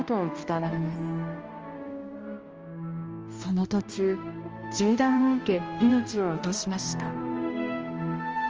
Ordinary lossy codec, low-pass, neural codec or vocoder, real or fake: Opus, 24 kbps; 7.2 kHz; codec, 16 kHz, 1 kbps, X-Codec, HuBERT features, trained on balanced general audio; fake